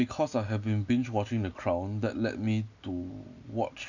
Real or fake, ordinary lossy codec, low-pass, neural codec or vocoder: real; none; 7.2 kHz; none